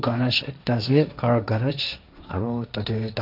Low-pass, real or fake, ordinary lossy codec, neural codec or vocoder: 5.4 kHz; fake; none; codec, 16 kHz, 1.1 kbps, Voila-Tokenizer